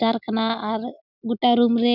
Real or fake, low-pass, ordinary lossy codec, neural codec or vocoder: real; 5.4 kHz; none; none